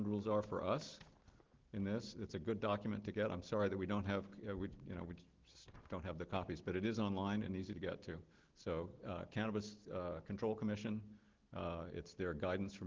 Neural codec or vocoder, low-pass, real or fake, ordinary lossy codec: none; 7.2 kHz; real; Opus, 16 kbps